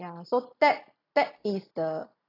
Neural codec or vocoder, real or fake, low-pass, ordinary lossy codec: none; real; 5.4 kHz; AAC, 24 kbps